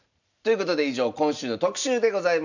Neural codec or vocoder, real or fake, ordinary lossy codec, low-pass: none; real; none; 7.2 kHz